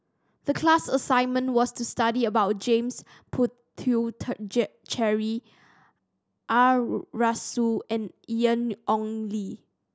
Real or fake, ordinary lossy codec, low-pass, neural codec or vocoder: real; none; none; none